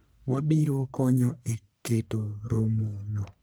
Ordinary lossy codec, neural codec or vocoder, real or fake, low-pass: none; codec, 44.1 kHz, 1.7 kbps, Pupu-Codec; fake; none